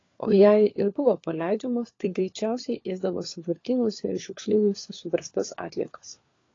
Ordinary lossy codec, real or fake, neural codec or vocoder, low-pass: AAC, 32 kbps; fake; codec, 16 kHz, 4 kbps, FunCodec, trained on LibriTTS, 50 frames a second; 7.2 kHz